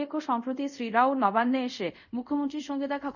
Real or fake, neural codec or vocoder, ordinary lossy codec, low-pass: fake; codec, 24 kHz, 0.5 kbps, DualCodec; none; 7.2 kHz